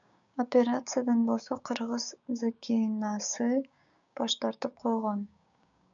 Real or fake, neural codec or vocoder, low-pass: fake; codec, 16 kHz, 6 kbps, DAC; 7.2 kHz